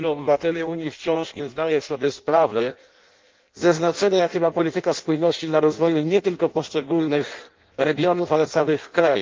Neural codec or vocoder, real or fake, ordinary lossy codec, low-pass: codec, 16 kHz in and 24 kHz out, 0.6 kbps, FireRedTTS-2 codec; fake; Opus, 32 kbps; 7.2 kHz